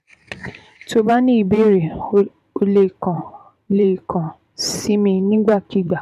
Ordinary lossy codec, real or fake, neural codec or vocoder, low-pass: MP3, 96 kbps; fake; vocoder, 44.1 kHz, 128 mel bands every 512 samples, BigVGAN v2; 14.4 kHz